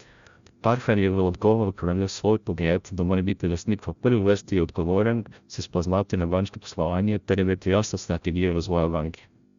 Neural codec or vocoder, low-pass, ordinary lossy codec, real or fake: codec, 16 kHz, 0.5 kbps, FreqCodec, larger model; 7.2 kHz; Opus, 64 kbps; fake